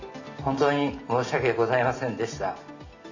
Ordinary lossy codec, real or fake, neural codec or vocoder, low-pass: none; real; none; 7.2 kHz